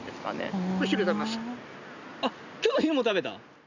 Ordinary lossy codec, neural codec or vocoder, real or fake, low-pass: none; none; real; 7.2 kHz